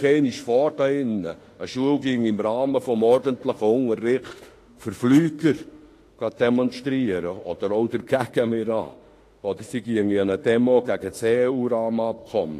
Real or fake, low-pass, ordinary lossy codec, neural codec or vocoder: fake; 14.4 kHz; AAC, 48 kbps; autoencoder, 48 kHz, 32 numbers a frame, DAC-VAE, trained on Japanese speech